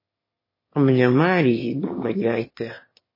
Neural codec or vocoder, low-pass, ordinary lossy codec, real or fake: autoencoder, 22.05 kHz, a latent of 192 numbers a frame, VITS, trained on one speaker; 5.4 kHz; MP3, 24 kbps; fake